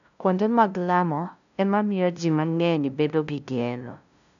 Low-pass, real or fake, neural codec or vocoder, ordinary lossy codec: 7.2 kHz; fake; codec, 16 kHz, 0.5 kbps, FunCodec, trained on LibriTTS, 25 frames a second; none